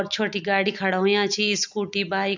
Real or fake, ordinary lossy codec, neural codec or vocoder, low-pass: real; none; none; 7.2 kHz